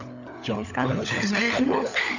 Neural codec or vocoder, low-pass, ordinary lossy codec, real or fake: codec, 16 kHz, 16 kbps, FunCodec, trained on LibriTTS, 50 frames a second; 7.2 kHz; none; fake